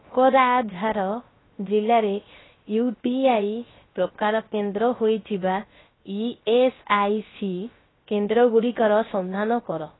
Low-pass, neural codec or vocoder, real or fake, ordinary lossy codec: 7.2 kHz; codec, 16 kHz, 0.3 kbps, FocalCodec; fake; AAC, 16 kbps